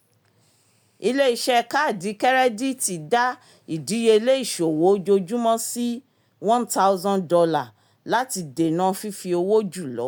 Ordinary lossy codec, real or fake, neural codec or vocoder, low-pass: none; real; none; none